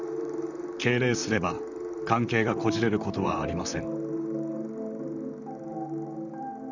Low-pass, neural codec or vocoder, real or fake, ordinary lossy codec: 7.2 kHz; vocoder, 44.1 kHz, 128 mel bands, Pupu-Vocoder; fake; none